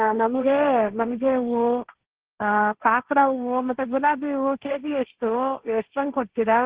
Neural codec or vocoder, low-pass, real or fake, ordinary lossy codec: codec, 16 kHz, 1.1 kbps, Voila-Tokenizer; 3.6 kHz; fake; Opus, 16 kbps